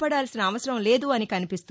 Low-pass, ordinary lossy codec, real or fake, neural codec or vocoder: none; none; real; none